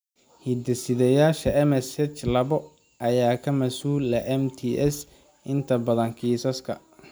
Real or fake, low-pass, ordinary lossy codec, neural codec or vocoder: real; none; none; none